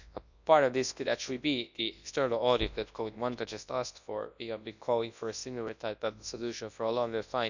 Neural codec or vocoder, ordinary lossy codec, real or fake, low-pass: codec, 24 kHz, 0.9 kbps, WavTokenizer, large speech release; none; fake; 7.2 kHz